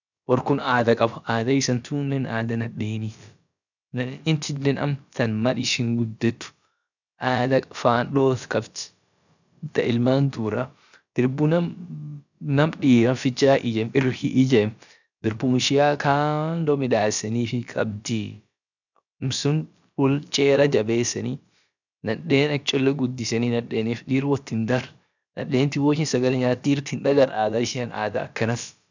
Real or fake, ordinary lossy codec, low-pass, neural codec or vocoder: fake; none; 7.2 kHz; codec, 16 kHz, about 1 kbps, DyCAST, with the encoder's durations